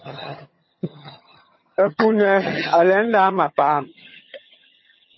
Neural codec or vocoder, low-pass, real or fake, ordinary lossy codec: vocoder, 22.05 kHz, 80 mel bands, HiFi-GAN; 7.2 kHz; fake; MP3, 24 kbps